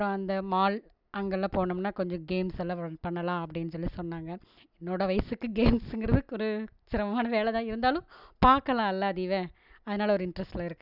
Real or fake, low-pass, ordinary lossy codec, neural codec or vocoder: real; 5.4 kHz; none; none